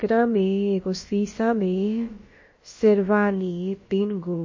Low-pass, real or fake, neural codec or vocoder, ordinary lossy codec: 7.2 kHz; fake; codec, 16 kHz, about 1 kbps, DyCAST, with the encoder's durations; MP3, 32 kbps